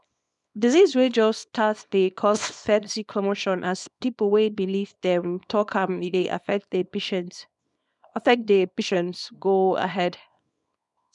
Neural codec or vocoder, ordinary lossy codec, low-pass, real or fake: codec, 24 kHz, 0.9 kbps, WavTokenizer, small release; none; 10.8 kHz; fake